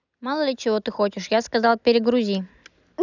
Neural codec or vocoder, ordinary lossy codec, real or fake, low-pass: none; none; real; 7.2 kHz